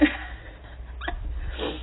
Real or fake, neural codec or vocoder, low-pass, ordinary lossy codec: real; none; 7.2 kHz; AAC, 16 kbps